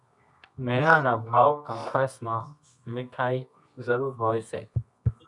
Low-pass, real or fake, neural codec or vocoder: 10.8 kHz; fake; codec, 24 kHz, 0.9 kbps, WavTokenizer, medium music audio release